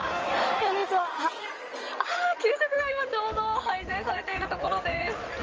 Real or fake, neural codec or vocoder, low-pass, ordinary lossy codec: fake; codec, 16 kHz in and 24 kHz out, 2.2 kbps, FireRedTTS-2 codec; 7.2 kHz; Opus, 24 kbps